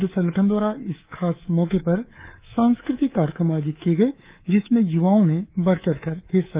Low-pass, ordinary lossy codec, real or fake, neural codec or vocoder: 3.6 kHz; Opus, 32 kbps; fake; codec, 24 kHz, 3.1 kbps, DualCodec